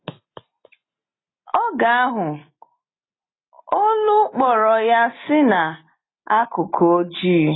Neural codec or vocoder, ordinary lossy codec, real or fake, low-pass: none; AAC, 16 kbps; real; 7.2 kHz